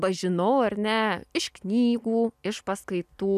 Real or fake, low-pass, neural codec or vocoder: real; 14.4 kHz; none